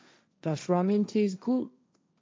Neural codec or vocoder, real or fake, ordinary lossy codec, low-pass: codec, 16 kHz, 1.1 kbps, Voila-Tokenizer; fake; none; none